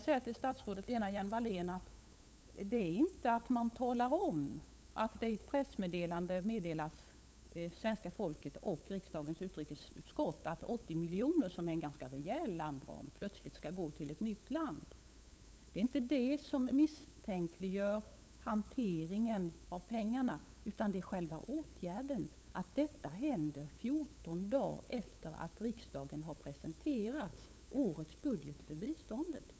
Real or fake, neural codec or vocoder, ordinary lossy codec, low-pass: fake; codec, 16 kHz, 8 kbps, FunCodec, trained on LibriTTS, 25 frames a second; none; none